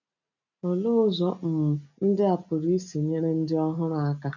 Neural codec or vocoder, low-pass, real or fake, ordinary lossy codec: none; 7.2 kHz; real; none